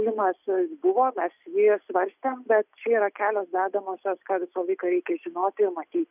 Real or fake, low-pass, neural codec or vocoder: real; 3.6 kHz; none